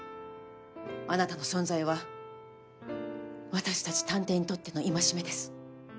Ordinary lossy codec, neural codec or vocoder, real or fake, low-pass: none; none; real; none